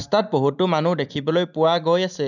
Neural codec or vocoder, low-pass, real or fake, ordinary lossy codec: none; 7.2 kHz; real; none